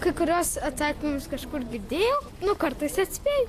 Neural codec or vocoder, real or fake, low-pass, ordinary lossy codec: none; real; 14.4 kHz; AAC, 64 kbps